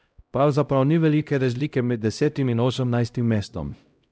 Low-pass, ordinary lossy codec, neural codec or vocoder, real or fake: none; none; codec, 16 kHz, 0.5 kbps, X-Codec, HuBERT features, trained on LibriSpeech; fake